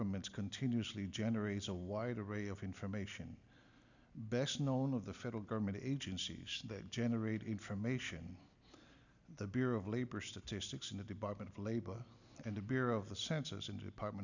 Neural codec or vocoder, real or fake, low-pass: none; real; 7.2 kHz